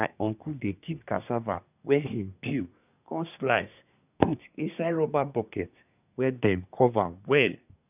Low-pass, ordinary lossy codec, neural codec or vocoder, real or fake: 3.6 kHz; none; codec, 24 kHz, 1 kbps, SNAC; fake